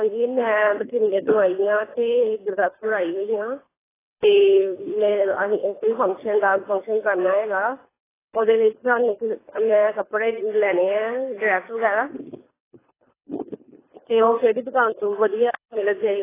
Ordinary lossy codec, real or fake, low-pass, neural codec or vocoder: AAC, 16 kbps; fake; 3.6 kHz; codec, 24 kHz, 3 kbps, HILCodec